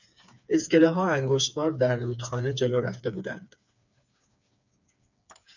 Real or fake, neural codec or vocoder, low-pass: fake; codec, 16 kHz, 4 kbps, FreqCodec, smaller model; 7.2 kHz